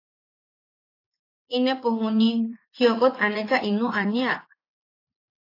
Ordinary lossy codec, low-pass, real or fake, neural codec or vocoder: AAC, 32 kbps; 5.4 kHz; fake; vocoder, 44.1 kHz, 80 mel bands, Vocos